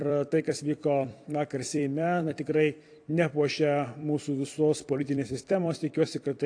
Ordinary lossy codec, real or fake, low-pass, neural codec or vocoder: AAC, 48 kbps; fake; 9.9 kHz; vocoder, 44.1 kHz, 128 mel bands every 256 samples, BigVGAN v2